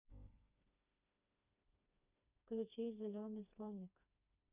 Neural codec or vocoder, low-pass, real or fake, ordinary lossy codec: codec, 16 kHz, 2 kbps, FreqCodec, smaller model; 3.6 kHz; fake; none